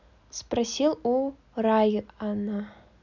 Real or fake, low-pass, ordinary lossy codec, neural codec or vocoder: real; 7.2 kHz; none; none